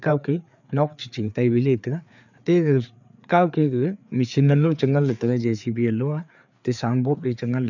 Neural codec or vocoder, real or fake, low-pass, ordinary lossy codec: codec, 16 kHz, 4 kbps, FreqCodec, larger model; fake; 7.2 kHz; none